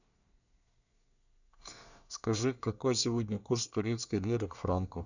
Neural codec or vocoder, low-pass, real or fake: codec, 24 kHz, 1 kbps, SNAC; 7.2 kHz; fake